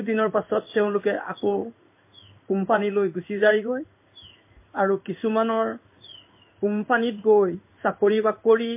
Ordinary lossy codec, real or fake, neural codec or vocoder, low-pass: MP3, 24 kbps; fake; codec, 16 kHz in and 24 kHz out, 1 kbps, XY-Tokenizer; 3.6 kHz